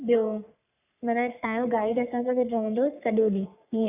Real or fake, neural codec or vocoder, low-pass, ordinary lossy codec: fake; codec, 44.1 kHz, 3.4 kbps, Pupu-Codec; 3.6 kHz; none